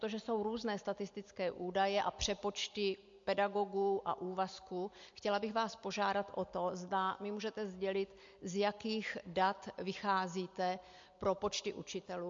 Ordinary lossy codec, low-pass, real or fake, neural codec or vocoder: MP3, 48 kbps; 7.2 kHz; real; none